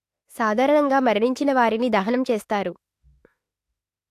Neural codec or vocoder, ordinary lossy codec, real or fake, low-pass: autoencoder, 48 kHz, 32 numbers a frame, DAC-VAE, trained on Japanese speech; AAC, 64 kbps; fake; 14.4 kHz